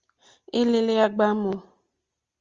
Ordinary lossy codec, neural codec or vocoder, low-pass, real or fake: Opus, 32 kbps; none; 7.2 kHz; real